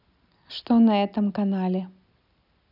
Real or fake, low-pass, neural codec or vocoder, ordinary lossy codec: real; 5.4 kHz; none; none